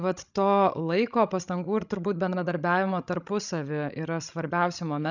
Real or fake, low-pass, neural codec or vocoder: fake; 7.2 kHz; codec, 16 kHz, 16 kbps, FreqCodec, larger model